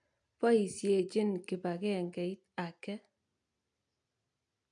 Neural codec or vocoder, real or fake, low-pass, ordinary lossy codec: none; real; 9.9 kHz; none